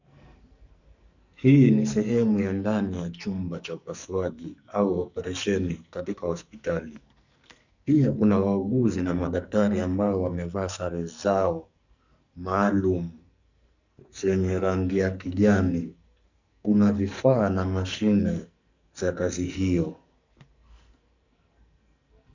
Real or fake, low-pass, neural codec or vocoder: fake; 7.2 kHz; codec, 44.1 kHz, 2.6 kbps, SNAC